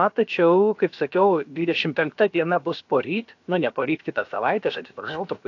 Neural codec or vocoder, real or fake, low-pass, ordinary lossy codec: codec, 16 kHz, about 1 kbps, DyCAST, with the encoder's durations; fake; 7.2 kHz; AAC, 48 kbps